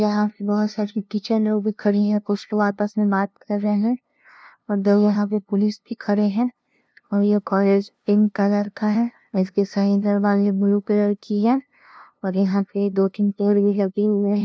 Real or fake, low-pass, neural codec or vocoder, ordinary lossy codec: fake; none; codec, 16 kHz, 0.5 kbps, FunCodec, trained on LibriTTS, 25 frames a second; none